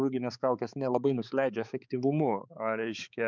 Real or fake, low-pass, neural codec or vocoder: fake; 7.2 kHz; codec, 16 kHz, 4 kbps, X-Codec, HuBERT features, trained on balanced general audio